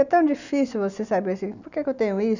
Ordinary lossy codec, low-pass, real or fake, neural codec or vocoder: none; 7.2 kHz; real; none